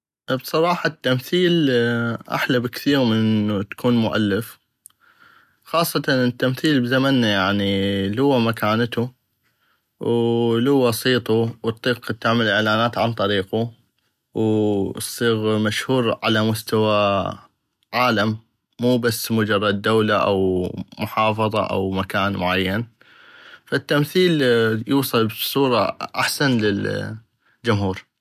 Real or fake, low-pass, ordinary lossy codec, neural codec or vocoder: real; 14.4 kHz; none; none